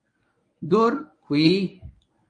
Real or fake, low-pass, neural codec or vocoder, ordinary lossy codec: fake; 9.9 kHz; codec, 24 kHz, 0.9 kbps, WavTokenizer, medium speech release version 1; AAC, 48 kbps